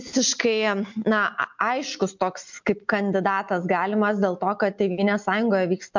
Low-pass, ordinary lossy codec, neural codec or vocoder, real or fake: 7.2 kHz; MP3, 64 kbps; none; real